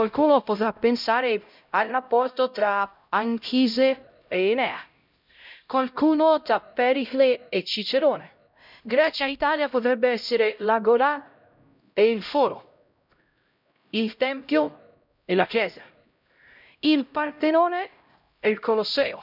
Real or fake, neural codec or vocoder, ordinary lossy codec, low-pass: fake; codec, 16 kHz, 0.5 kbps, X-Codec, HuBERT features, trained on LibriSpeech; none; 5.4 kHz